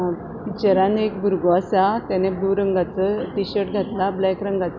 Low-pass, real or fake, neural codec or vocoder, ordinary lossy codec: 7.2 kHz; real; none; none